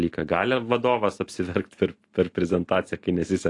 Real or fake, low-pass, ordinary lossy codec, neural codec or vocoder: real; 10.8 kHz; AAC, 48 kbps; none